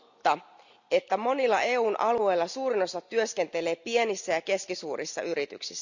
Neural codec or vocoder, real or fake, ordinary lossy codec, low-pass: none; real; none; 7.2 kHz